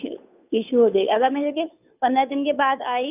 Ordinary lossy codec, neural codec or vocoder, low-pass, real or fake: none; codec, 16 kHz, 2 kbps, FunCodec, trained on Chinese and English, 25 frames a second; 3.6 kHz; fake